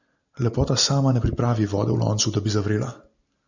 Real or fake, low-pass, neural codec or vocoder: real; 7.2 kHz; none